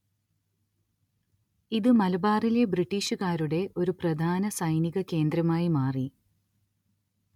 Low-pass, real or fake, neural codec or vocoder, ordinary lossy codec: 19.8 kHz; real; none; MP3, 96 kbps